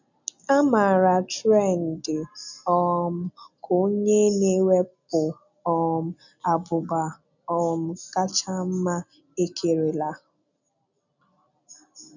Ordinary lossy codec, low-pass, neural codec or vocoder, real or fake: none; 7.2 kHz; none; real